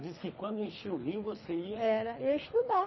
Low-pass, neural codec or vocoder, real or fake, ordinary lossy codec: 7.2 kHz; codec, 24 kHz, 3 kbps, HILCodec; fake; MP3, 24 kbps